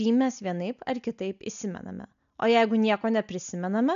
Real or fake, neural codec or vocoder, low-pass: real; none; 7.2 kHz